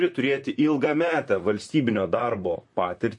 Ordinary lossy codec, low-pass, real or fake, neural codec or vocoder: MP3, 48 kbps; 10.8 kHz; fake; vocoder, 44.1 kHz, 128 mel bands, Pupu-Vocoder